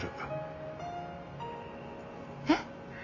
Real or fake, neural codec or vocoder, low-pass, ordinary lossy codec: real; none; 7.2 kHz; none